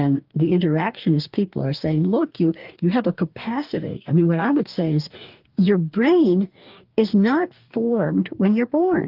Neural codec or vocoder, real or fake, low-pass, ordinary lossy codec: codec, 44.1 kHz, 2.6 kbps, DAC; fake; 5.4 kHz; Opus, 24 kbps